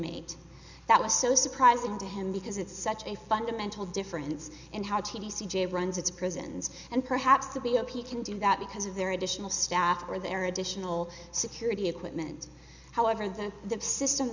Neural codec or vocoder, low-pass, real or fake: none; 7.2 kHz; real